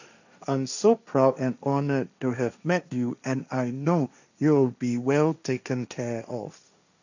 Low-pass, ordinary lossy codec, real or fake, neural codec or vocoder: 7.2 kHz; none; fake; codec, 16 kHz, 1.1 kbps, Voila-Tokenizer